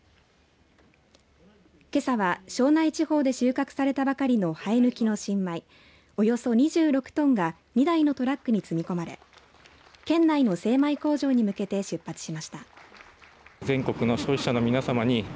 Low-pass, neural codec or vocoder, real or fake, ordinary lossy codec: none; none; real; none